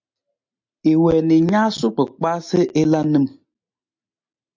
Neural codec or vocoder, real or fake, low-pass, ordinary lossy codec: none; real; 7.2 kHz; MP3, 48 kbps